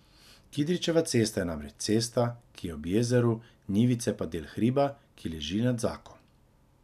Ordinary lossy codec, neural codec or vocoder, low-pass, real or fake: none; none; 14.4 kHz; real